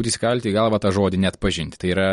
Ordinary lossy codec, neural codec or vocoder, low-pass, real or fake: MP3, 48 kbps; none; 19.8 kHz; real